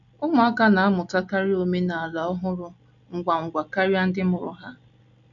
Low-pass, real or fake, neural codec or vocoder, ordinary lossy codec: 7.2 kHz; real; none; none